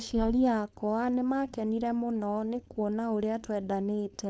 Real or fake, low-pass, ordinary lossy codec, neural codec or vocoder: fake; none; none; codec, 16 kHz, 4.8 kbps, FACodec